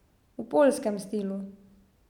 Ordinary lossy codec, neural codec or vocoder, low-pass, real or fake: none; none; 19.8 kHz; real